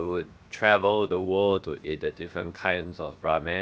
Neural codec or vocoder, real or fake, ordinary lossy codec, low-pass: codec, 16 kHz, 0.7 kbps, FocalCodec; fake; none; none